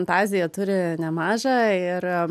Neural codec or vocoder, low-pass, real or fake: none; 14.4 kHz; real